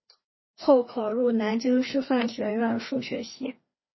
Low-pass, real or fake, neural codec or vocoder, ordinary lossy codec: 7.2 kHz; fake; codec, 16 kHz, 2 kbps, FreqCodec, larger model; MP3, 24 kbps